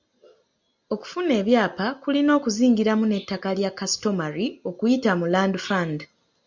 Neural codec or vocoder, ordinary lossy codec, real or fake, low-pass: none; MP3, 64 kbps; real; 7.2 kHz